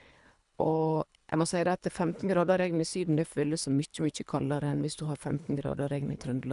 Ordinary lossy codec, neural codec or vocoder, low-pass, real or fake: none; codec, 24 kHz, 3 kbps, HILCodec; 10.8 kHz; fake